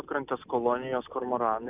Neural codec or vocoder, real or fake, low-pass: none; real; 3.6 kHz